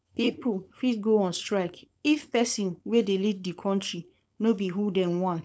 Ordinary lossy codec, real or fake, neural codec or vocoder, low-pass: none; fake; codec, 16 kHz, 4.8 kbps, FACodec; none